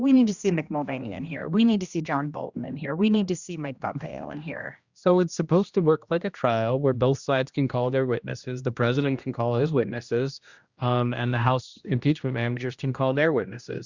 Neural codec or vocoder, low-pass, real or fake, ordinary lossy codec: codec, 16 kHz, 1 kbps, X-Codec, HuBERT features, trained on general audio; 7.2 kHz; fake; Opus, 64 kbps